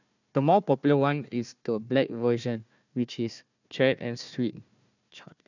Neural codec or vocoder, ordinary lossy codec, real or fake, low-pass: codec, 16 kHz, 1 kbps, FunCodec, trained on Chinese and English, 50 frames a second; none; fake; 7.2 kHz